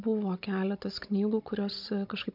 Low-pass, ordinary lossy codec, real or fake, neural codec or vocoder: 5.4 kHz; MP3, 48 kbps; fake; codec, 16 kHz, 16 kbps, FunCodec, trained on Chinese and English, 50 frames a second